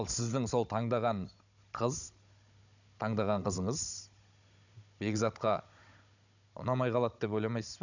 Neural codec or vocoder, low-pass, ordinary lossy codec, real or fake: codec, 16 kHz, 16 kbps, FunCodec, trained on Chinese and English, 50 frames a second; 7.2 kHz; none; fake